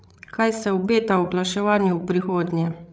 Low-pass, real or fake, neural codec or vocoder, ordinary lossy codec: none; fake; codec, 16 kHz, 8 kbps, FreqCodec, larger model; none